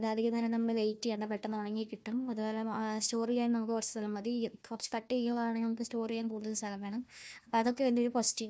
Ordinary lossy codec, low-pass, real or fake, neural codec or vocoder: none; none; fake; codec, 16 kHz, 1 kbps, FunCodec, trained on Chinese and English, 50 frames a second